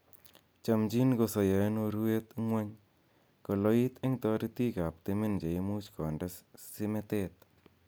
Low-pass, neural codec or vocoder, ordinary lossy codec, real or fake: none; none; none; real